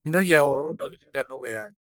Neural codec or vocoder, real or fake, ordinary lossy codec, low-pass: codec, 44.1 kHz, 1.7 kbps, Pupu-Codec; fake; none; none